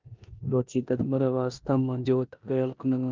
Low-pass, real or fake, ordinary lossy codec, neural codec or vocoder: 7.2 kHz; fake; Opus, 24 kbps; codec, 16 kHz in and 24 kHz out, 0.9 kbps, LongCat-Audio-Codec, four codebook decoder